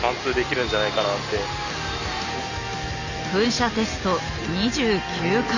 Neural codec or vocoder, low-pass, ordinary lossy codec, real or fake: none; 7.2 kHz; none; real